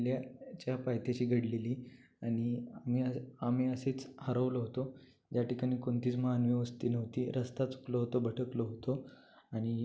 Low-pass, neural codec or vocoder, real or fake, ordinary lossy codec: none; none; real; none